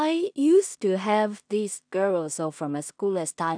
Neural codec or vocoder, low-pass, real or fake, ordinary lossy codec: codec, 16 kHz in and 24 kHz out, 0.4 kbps, LongCat-Audio-Codec, two codebook decoder; 9.9 kHz; fake; none